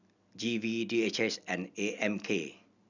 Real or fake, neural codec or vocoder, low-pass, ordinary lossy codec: real; none; 7.2 kHz; none